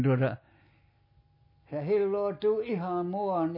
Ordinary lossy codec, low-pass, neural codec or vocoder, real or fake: MP3, 24 kbps; 5.4 kHz; none; real